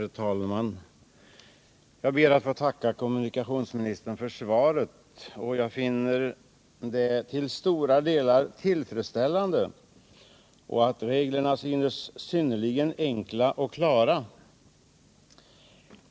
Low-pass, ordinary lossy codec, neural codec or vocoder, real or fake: none; none; none; real